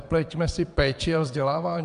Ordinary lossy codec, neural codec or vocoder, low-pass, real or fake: MP3, 96 kbps; vocoder, 22.05 kHz, 80 mel bands, WaveNeXt; 9.9 kHz; fake